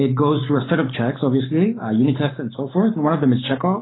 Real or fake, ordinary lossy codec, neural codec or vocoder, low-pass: fake; AAC, 16 kbps; codec, 44.1 kHz, 7.8 kbps, Pupu-Codec; 7.2 kHz